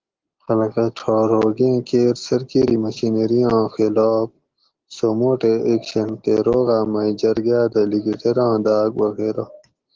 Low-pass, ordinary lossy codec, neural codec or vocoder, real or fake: 7.2 kHz; Opus, 16 kbps; none; real